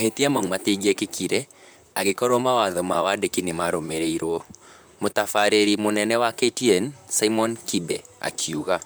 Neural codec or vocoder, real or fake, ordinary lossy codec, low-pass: vocoder, 44.1 kHz, 128 mel bands, Pupu-Vocoder; fake; none; none